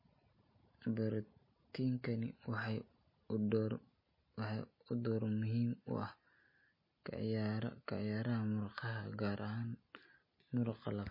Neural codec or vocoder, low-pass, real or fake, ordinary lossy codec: none; 5.4 kHz; real; MP3, 24 kbps